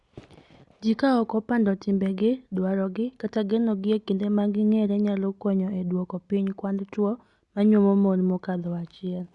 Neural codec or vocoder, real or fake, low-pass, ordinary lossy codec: none; real; 10.8 kHz; Opus, 64 kbps